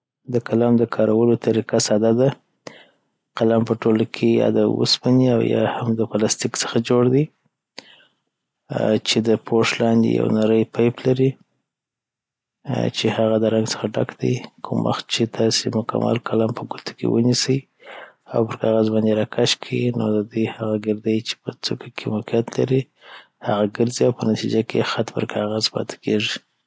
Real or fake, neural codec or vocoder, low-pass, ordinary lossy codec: real; none; none; none